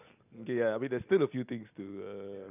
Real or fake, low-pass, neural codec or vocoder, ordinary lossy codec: real; 3.6 kHz; none; none